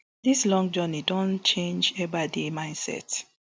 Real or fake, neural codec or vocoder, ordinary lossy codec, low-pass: real; none; none; none